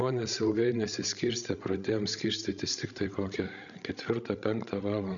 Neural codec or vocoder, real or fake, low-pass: codec, 16 kHz, 16 kbps, FreqCodec, larger model; fake; 7.2 kHz